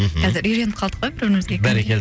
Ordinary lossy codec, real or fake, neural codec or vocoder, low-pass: none; real; none; none